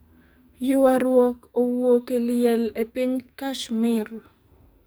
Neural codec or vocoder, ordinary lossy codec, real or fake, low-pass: codec, 44.1 kHz, 2.6 kbps, SNAC; none; fake; none